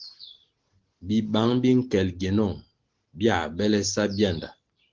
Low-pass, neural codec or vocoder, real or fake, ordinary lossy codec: 7.2 kHz; none; real; Opus, 16 kbps